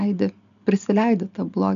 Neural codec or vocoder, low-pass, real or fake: none; 7.2 kHz; real